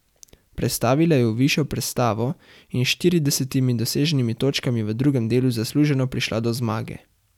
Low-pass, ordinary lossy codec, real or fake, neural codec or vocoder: 19.8 kHz; none; real; none